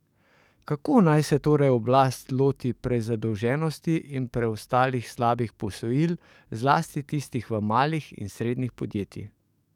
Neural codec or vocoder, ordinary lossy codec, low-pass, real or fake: codec, 44.1 kHz, 7.8 kbps, DAC; none; 19.8 kHz; fake